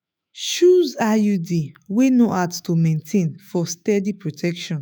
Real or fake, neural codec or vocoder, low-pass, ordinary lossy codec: fake; autoencoder, 48 kHz, 128 numbers a frame, DAC-VAE, trained on Japanese speech; none; none